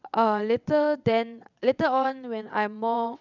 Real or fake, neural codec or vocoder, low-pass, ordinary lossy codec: fake; vocoder, 22.05 kHz, 80 mel bands, WaveNeXt; 7.2 kHz; none